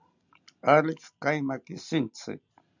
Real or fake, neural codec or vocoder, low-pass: real; none; 7.2 kHz